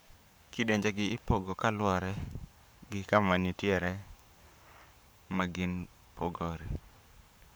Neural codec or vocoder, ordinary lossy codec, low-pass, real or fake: codec, 44.1 kHz, 7.8 kbps, Pupu-Codec; none; none; fake